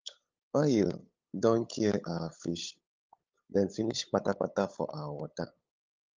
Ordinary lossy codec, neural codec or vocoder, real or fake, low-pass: Opus, 24 kbps; codec, 16 kHz, 8 kbps, FunCodec, trained on LibriTTS, 25 frames a second; fake; 7.2 kHz